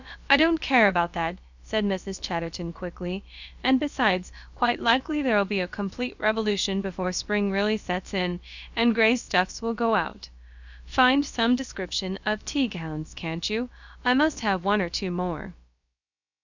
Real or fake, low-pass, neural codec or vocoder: fake; 7.2 kHz; codec, 16 kHz, about 1 kbps, DyCAST, with the encoder's durations